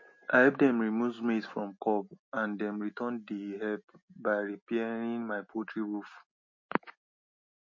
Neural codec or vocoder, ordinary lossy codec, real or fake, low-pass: none; MP3, 32 kbps; real; 7.2 kHz